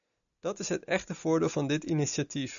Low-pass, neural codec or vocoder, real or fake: 7.2 kHz; none; real